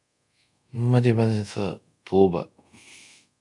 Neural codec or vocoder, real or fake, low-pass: codec, 24 kHz, 0.5 kbps, DualCodec; fake; 10.8 kHz